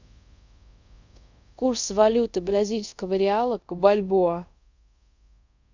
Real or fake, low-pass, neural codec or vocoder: fake; 7.2 kHz; codec, 24 kHz, 0.5 kbps, DualCodec